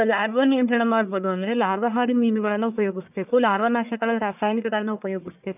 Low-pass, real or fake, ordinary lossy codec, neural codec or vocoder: 3.6 kHz; fake; none; codec, 44.1 kHz, 1.7 kbps, Pupu-Codec